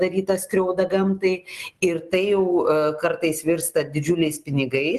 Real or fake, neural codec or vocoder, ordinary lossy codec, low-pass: real; none; Opus, 24 kbps; 14.4 kHz